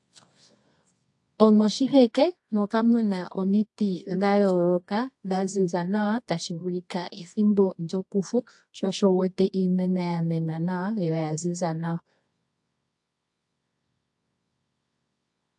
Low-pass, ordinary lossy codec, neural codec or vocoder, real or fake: 10.8 kHz; AAC, 64 kbps; codec, 24 kHz, 0.9 kbps, WavTokenizer, medium music audio release; fake